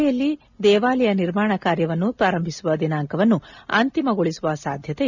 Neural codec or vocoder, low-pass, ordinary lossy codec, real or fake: none; 7.2 kHz; none; real